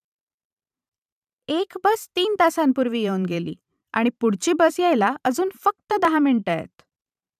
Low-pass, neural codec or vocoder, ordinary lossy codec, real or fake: 14.4 kHz; vocoder, 44.1 kHz, 128 mel bands every 512 samples, BigVGAN v2; none; fake